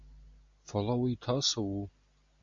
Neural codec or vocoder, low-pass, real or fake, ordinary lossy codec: none; 7.2 kHz; real; AAC, 64 kbps